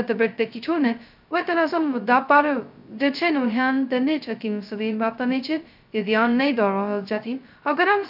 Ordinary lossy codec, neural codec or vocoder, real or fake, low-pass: none; codec, 16 kHz, 0.2 kbps, FocalCodec; fake; 5.4 kHz